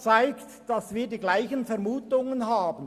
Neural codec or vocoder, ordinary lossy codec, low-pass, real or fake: none; Opus, 64 kbps; 14.4 kHz; real